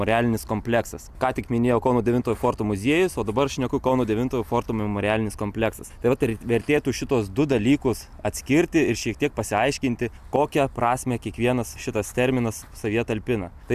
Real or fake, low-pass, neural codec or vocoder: real; 14.4 kHz; none